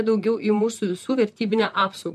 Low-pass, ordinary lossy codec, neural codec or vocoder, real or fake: 14.4 kHz; MP3, 64 kbps; vocoder, 44.1 kHz, 128 mel bands, Pupu-Vocoder; fake